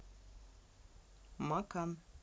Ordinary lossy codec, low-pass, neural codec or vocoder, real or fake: none; none; none; real